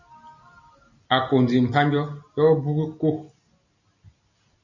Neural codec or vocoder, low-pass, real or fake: none; 7.2 kHz; real